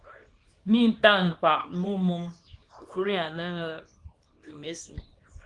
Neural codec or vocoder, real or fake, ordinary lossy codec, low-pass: codec, 24 kHz, 0.9 kbps, WavTokenizer, small release; fake; Opus, 24 kbps; 10.8 kHz